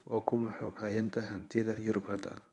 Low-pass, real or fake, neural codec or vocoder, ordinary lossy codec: 10.8 kHz; fake; codec, 24 kHz, 0.9 kbps, WavTokenizer, medium speech release version 1; none